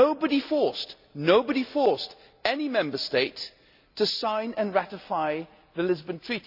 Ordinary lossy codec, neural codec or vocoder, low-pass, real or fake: none; none; 5.4 kHz; real